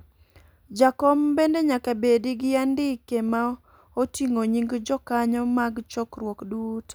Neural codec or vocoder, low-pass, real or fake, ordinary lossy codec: none; none; real; none